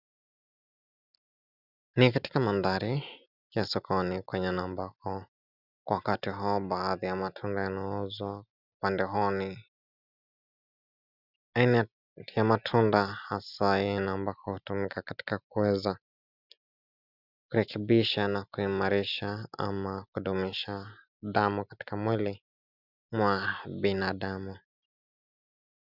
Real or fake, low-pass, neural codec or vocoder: real; 5.4 kHz; none